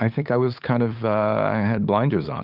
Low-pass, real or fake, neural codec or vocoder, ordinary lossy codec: 5.4 kHz; fake; codec, 16 kHz, 8 kbps, FunCodec, trained on LibriTTS, 25 frames a second; Opus, 32 kbps